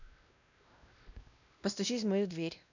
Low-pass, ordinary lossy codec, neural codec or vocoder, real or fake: 7.2 kHz; none; codec, 16 kHz, 1 kbps, X-Codec, WavLM features, trained on Multilingual LibriSpeech; fake